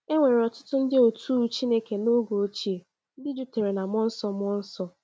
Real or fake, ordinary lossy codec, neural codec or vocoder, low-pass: real; none; none; none